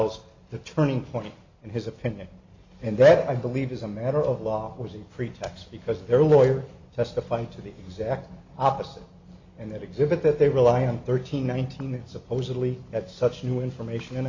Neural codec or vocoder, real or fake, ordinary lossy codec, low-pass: none; real; MP3, 64 kbps; 7.2 kHz